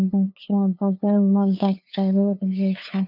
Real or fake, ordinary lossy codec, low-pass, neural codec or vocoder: fake; none; 5.4 kHz; codec, 16 kHz, 2 kbps, FunCodec, trained on LibriTTS, 25 frames a second